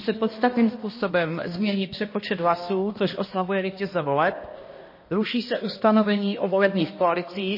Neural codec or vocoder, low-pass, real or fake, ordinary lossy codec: codec, 16 kHz, 1 kbps, X-Codec, HuBERT features, trained on balanced general audio; 5.4 kHz; fake; MP3, 24 kbps